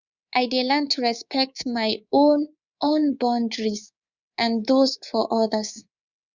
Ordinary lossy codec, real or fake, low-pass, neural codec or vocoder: Opus, 64 kbps; fake; 7.2 kHz; codec, 24 kHz, 3.1 kbps, DualCodec